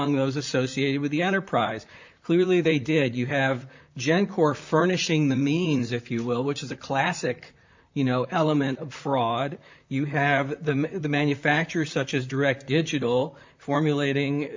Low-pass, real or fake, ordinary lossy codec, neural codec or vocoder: 7.2 kHz; fake; MP3, 64 kbps; vocoder, 44.1 kHz, 128 mel bands, Pupu-Vocoder